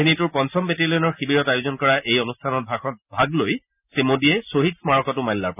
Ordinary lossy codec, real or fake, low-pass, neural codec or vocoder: none; real; 3.6 kHz; none